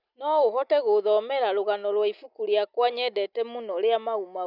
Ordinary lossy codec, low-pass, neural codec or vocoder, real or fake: none; 5.4 kHz; none; real